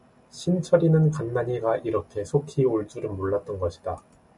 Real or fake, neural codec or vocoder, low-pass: real; none; 10.8 kHz